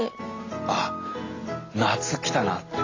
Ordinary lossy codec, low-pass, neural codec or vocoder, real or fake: AAC, 32 kbps; 7.2 kHz; none; real